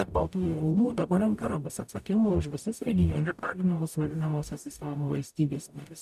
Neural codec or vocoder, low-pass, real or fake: codec, 44.1 kHz, 0.9 kbps, DAC; 14.4 kHz; fake